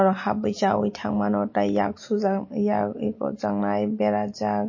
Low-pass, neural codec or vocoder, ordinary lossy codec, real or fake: 7.2 kHz; none; MP3, 32 kbps; real